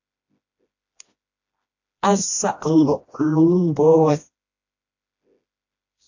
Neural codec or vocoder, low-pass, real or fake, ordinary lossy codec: codec, 16 kHz, 1 kbps, FreqCodec, smaller model; 7.2 kHz; fake; AAC, 48 kbps